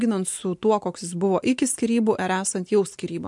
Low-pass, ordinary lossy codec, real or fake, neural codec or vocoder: 10.8 kHz; MP3, 64 kbps; real; none